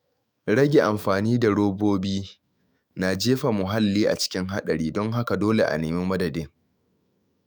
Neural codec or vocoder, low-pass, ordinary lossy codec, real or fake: autoencoder, 48 kHz, 128 numbers a frame, DAC-VAE, trained on Japanese speech; none; none; fake